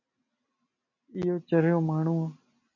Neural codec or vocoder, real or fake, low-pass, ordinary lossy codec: none; real; 7.2 kHz; MP3, 48 kbps